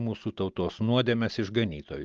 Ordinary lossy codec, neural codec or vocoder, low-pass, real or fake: Opus, 32 kbps; none; 7.2 kHz; real